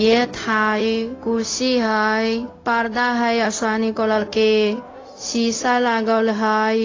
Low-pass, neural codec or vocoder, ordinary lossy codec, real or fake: 7.2 kHz; codec, 16 kHz, 0.4 kbps, LongCat-Audio-Codec; AAC, 32 kbps; fake